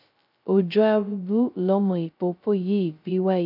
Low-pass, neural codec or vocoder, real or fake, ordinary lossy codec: 5.4 kHz; codec, 16 kHz, 0.2 kbps, FocalCodec; fake; none